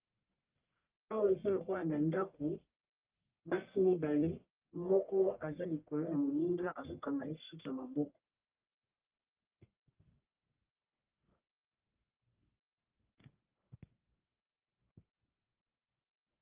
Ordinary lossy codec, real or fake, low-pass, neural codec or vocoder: Opus, 24 kbps; fake; 3.6 kHz; codec, 44.1 kHz, 1.7 kbps, Pupu-Codec